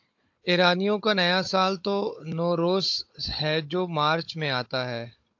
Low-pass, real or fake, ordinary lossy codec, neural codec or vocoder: 7.2 kHz; fake; AAC, 48 kbps; codec, 16 kHz, 16 kbps, FunCodec, trained on Chinese and English, 50 frames a second